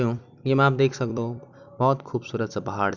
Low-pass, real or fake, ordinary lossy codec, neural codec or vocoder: 7.2 kHz; fake; none; vocoder, 44.1 kHz, 128 mel bands every 256 samples, BigVGAN v2